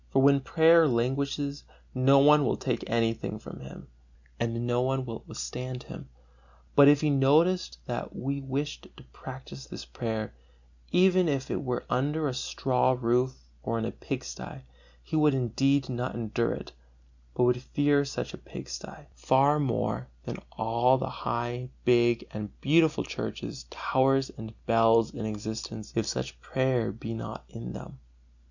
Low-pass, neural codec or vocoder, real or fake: 7.2 kHz; none; real